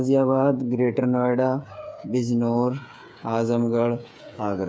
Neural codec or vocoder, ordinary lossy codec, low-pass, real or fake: codec, 16 kHz, 8 kbps, FreqCodec, smaller model; none; none; fake